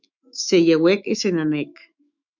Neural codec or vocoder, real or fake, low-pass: autoencoder, 48 kHz, 128 numbers a frame, DAC-VAE, trained on Japanese speech; fake; 7.2 kHz